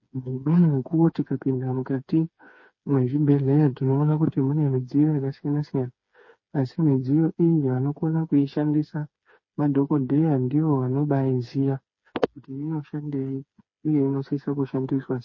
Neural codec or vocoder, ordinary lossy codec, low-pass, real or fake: codec, 16 kHz, 4 kbps, FreqCodec, smaller model; MP3, 32 kbps; 7.2 kHz; fake